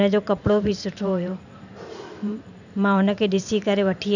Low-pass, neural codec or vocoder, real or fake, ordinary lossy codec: 7.2 kHz; vocoder, 44.1 kHz, 128 mel bands every 512 samples, BigVGAN v2; fake; none